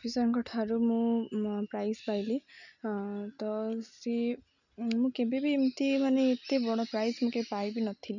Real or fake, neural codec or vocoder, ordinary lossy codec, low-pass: real; none; none; 7.2 kHz